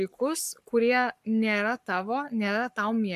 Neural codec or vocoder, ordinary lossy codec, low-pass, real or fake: codec, 44.1 kHz, 7.8 kbps, Pupu-Codec; MP3, 96 kbps; 14.4 kHz; fake